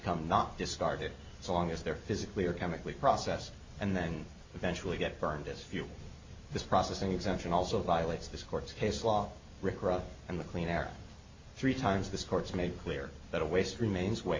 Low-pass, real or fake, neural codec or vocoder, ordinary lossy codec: 7.2 kHz; real; none; MP3, 48 kbps